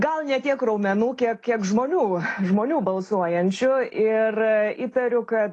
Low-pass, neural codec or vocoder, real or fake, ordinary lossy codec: 10.8 kHz; none; real; AAC, 32 kbps